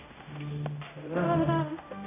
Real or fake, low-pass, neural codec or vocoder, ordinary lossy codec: fake; 3.6 kHz; codec, 16 kHz, 0.5 kbps, X-Codec, HuBERT features, trained on general audio; none